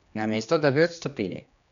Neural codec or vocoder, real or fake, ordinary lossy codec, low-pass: codec, 16 kHz, 2 kbps, X-Codec, HuBERT features, trained on general audio; fake; none; 7.2 kHz